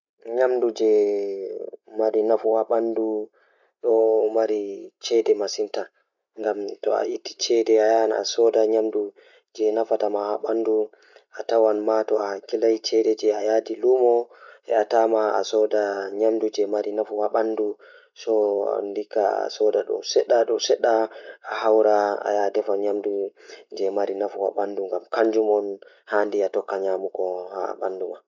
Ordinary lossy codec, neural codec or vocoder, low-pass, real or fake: none; none; 7.2 kHz; real